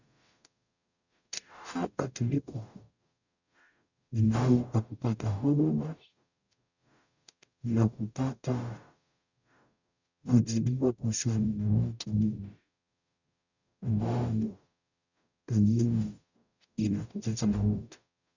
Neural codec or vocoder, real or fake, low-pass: codec, 44.1 kHz, 0.9 kbps, DAC; fake; 7.2 kHz